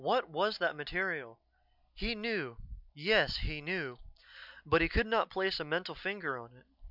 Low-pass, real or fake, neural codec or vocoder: 5.4 kHz; real; none